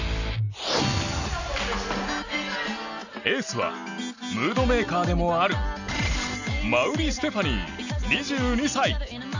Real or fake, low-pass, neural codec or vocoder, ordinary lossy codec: real; 7.2 kHz; none; none